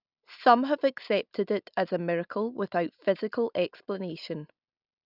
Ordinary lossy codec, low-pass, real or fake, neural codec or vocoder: none; 5.4 kHz; real; none